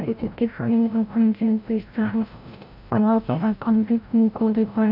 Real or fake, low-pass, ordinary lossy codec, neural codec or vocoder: fake; 5.4 kHz; none; codec, 16 kHz, 0.5 kbps, FreqCodec, larger model